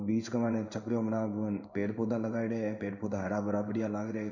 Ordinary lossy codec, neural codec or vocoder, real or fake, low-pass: none; codec, 16 kHz in and 24 kHz out, 1 kbps, XY-Tokenizer; fake; 7.2 kHz